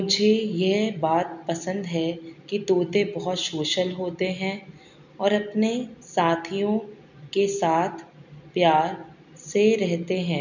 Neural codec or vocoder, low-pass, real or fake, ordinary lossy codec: none; 7.2 kHz; real; none